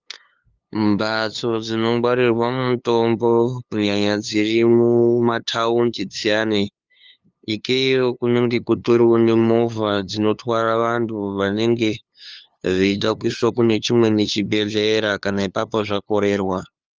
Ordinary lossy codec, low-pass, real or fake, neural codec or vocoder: Opus, 24 kbps; 7.2 kHz; fake; codec, 16 kHz, 2 kbps, FunCodec, trained on LibriTTS, 25 frames a second